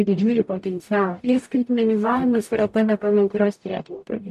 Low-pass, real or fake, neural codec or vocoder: 14.4 kHz; fake; codec, 44.1 kHz, 0.9 kbps, DAC